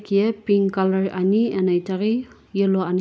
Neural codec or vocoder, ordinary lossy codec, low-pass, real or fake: none; none; none; real